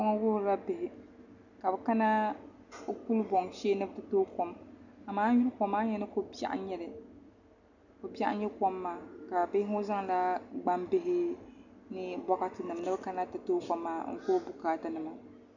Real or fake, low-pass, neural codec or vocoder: real; 7.2 kHz; none